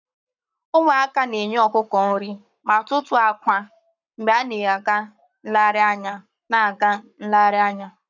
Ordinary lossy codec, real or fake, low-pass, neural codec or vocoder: none; fake; 7.2 kHz; codec, 44.1 kHz, 7.8 kbps, Pupu-Codec